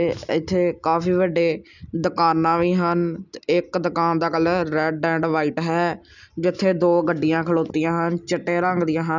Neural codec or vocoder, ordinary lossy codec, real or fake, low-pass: none; none; real; 7.2 kHz